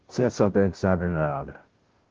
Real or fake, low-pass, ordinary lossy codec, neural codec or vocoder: fake; 7.2 kHz; Opus, 16 kbps; codec, 16 kHz, 0.5 kbps, FunCodec, trained on Chinese and English, 25 frames a second